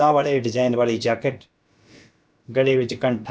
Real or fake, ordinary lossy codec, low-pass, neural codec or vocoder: fake; none; none; codec, 16 kHz, about 1 kbps, DyCAST, with the encoder's durations